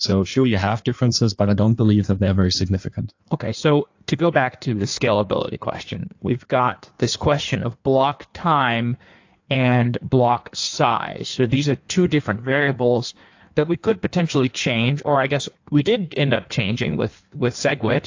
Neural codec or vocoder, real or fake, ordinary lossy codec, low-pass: codec, 16 kHz in and 24 kHz out, 1.1 kbps, FireRedTTS-2 codec; fake; AAC, 48 kbps; 7.2 kHz